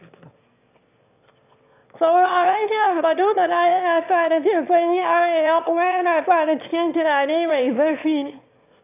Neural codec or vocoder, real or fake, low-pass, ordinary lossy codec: autoencoder, 22.05 kHz, a latent of 192 numbers a frame, VITS, trained on one speaker; fake; 3.6 kHz; none